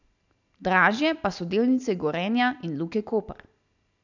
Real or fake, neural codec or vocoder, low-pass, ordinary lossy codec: real; none; 7.2 kHz; none